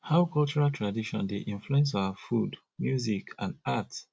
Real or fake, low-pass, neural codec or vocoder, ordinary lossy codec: real; none; none; none